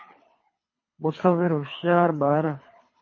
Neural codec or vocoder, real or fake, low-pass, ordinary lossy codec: codec, 24 kHz, 3 kbps, HILCodec; fake; 7.2 kHz; MP3, 32 kbps